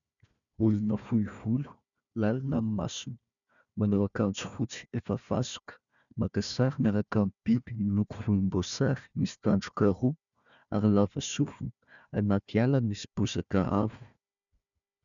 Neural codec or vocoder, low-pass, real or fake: codec, 16 kHz, 1 kbps, FunCodec, trained on Chinese and English, 50 frames a second; 7.2 kHz; fake